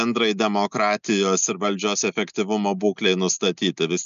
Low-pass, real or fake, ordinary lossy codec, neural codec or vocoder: 7.2 kHz; real; MP3, 96 kbps; none